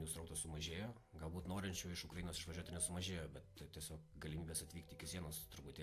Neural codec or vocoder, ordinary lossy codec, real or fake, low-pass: none; AAC, 64 kbps; real; 14.4 kHz